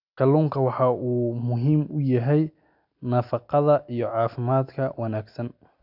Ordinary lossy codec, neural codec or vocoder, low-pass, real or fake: none; none; 5.4 kHz; real